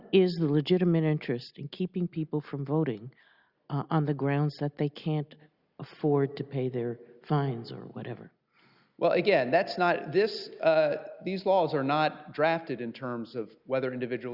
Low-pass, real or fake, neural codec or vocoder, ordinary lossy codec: 5.4 kHz; real; none; Opus, 64 kbps